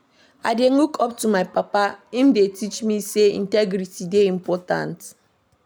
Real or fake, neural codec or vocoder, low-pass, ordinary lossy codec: real; none; none; none